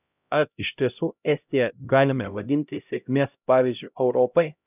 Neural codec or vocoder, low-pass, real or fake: codec, 16 kHz, 0.5 kbps, X-Codec, HuBERT features, trained on LibriSpeech; 3.6 kHz; fake